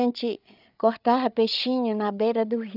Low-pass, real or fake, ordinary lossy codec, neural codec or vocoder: 5.4 kHz; fake; none; codec, 16 kHz, 8 kbps, FreqCodec, larger model